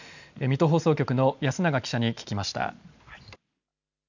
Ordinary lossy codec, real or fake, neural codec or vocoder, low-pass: none; real; none; 7.2 kHz